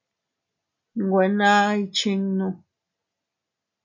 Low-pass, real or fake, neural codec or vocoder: 7.2 kHz; real; none